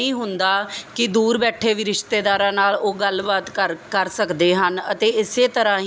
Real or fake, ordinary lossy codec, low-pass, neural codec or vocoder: real; none; none; none